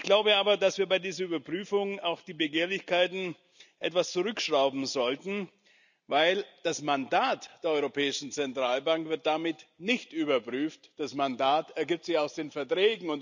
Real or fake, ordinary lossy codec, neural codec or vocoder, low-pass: real; none; none; 7.2 kHz